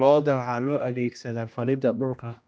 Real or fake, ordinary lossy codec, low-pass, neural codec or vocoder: fake; none; none; codec, 16 kHz, 1 kbps, X-Codec, HuBERT features, trained on general audio